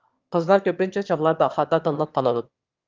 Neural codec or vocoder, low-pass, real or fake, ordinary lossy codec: autoencoder, 22.05 kHz, a latent of 192 numbers a frame, VITS, trained on one speaker; 7.2 kHz; fake; Opus, 32 kbps